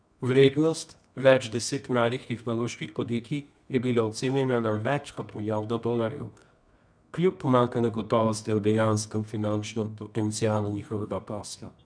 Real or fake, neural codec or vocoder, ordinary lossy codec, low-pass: fake; codec, 24 kHz, 0.9 kbps, WavTokenizer, medium music audio release; none; 9.9 kHz